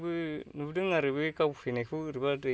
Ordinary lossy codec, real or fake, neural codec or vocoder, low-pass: none; real; none; none